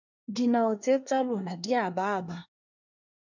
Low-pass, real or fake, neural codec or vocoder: 7.2 kHz; fake; codec, 24 kHz, 1 kbps, SNAC